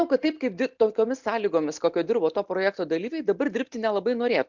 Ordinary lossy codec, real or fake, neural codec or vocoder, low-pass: MP3, 64 kbps; real; none; 7.2 kHz